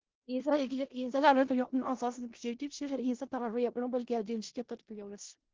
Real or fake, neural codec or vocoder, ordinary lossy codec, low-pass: fake; codec, 16 kHz in and 24 kHz out, 0.4 kbps, LongCat-Audio-Codec, four codebook decoder; Opus, 16 kbps; 7.2 kHz